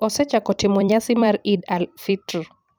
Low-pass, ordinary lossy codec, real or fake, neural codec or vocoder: none; none; fake; vocoder, 44.1 kHz, 128 mel bands every 256 samples, BigVGAN v2